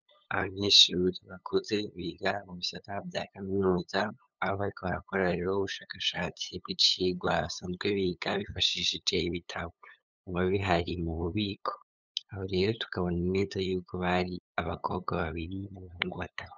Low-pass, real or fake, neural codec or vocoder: 7.2 kHz; fake; codec, 16 kHz, 8 kbps, FunCodec, trained on LibriTTS, 25 frames a second